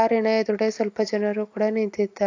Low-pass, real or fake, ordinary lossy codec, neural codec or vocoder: 7.2 kHz; real; AAC, 48 kbps; none